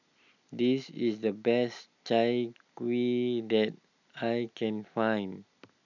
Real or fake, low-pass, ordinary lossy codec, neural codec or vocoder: real; 7.2 kHz; none; none